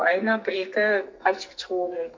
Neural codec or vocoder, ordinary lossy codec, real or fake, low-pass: codec, 44.1 kHz, 2.6 kbps, SNAC; MP3, 64 kbps; fake; 7.2 kHz